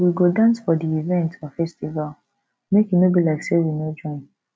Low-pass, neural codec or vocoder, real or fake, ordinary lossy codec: none; none; real; none